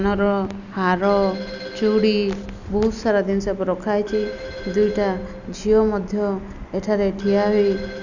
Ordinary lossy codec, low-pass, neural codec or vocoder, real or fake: none; 7.2 kHz; none; real